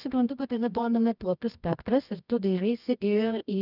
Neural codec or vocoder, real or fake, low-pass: codec, 24 kHz, 0.9 kbps, WavTokenizer, medium music audio release; fake; 5.4 kHz